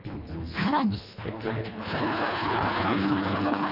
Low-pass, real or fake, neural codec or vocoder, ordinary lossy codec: 5.4 kHz; fake; codec, 24 kHz, 1.5 kbps, HILCodec; none